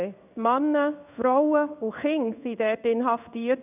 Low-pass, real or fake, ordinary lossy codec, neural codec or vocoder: 3.6 kHz; fake; none; codec, 16 kHz in and 24 kHz out, 1 kbps, XY-Tokenizer